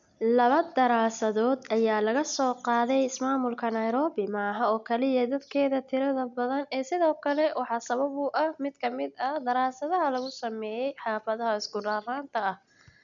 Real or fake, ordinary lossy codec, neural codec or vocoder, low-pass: real; none; none; 7.2 kHz